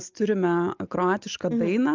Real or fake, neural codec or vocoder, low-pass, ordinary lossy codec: real; none; 7.2 kHz; Opus, 24 kbps